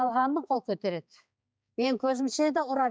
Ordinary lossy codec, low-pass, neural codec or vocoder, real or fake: none; none; codec, 16 kHz, 4 kbps, X-Codec, HuBERT features, trained on balanced general audio; fake